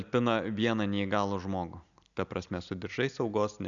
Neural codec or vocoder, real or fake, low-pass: none; real; 7.2 kHz